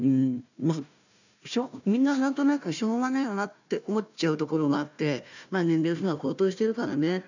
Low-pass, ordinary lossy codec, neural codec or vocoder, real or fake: 7.2 kHz; none; codec, 16 kHz, 1 kbps, FunCodec, trained on Chinese and English, 50 frames a second; fake